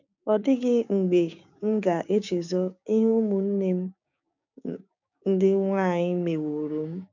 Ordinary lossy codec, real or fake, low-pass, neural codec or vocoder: none; fake; 7.2 kHz; codec, 16 kHz, 6 kbps, DAC